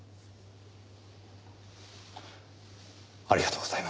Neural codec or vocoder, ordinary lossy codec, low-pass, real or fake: none; none; none; real